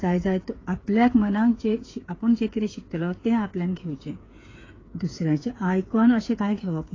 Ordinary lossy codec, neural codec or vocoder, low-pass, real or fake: AAC, 32 kbps; codec, 16 kHz, 8 kbps, FreqCodec, smaller model; 7.2 kHz; fake